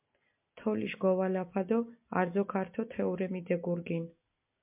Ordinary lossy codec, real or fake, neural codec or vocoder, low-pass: MP3, 32 kbps; real; none; 3.6 kHz